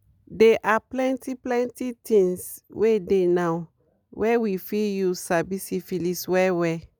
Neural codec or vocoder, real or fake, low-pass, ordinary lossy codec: none; real; none; none